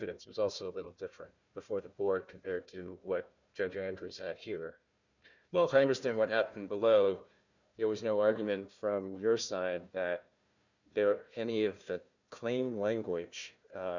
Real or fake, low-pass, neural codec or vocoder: fake; 7.2 kHz; codec, 16 kHz, 1 kbps, FunCodec, trained on Chinese and English, 50 frames a second